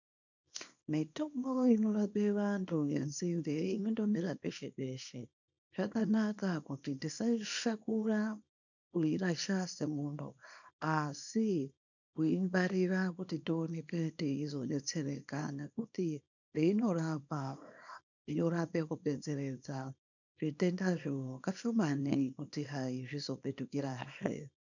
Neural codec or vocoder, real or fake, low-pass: codec, 24 kHz, 0.9 kbps, WavTokenizer, small release; fake; 7.2 kHz